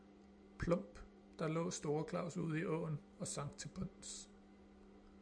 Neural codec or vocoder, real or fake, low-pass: none; real; 9.9 kHz